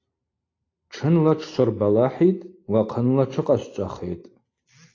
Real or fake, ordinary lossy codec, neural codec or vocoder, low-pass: real; AAC, 32 kbps; none; 7.2 kHz